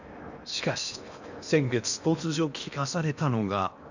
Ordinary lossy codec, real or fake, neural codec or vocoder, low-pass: none; fake; codec, 16 kHz in and 24 kHz out, 0.8 kbps, FocalCodec, streaming, 65536 codes; 7.2 kHz